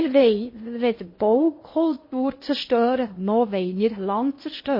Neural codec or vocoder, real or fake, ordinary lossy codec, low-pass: codec, 16 kHz in and 24 kHz out, 0.6 kbps, FocalCodec, streaming, 2048 codes; fake; MP3, 24 kbps; 5.4 kHz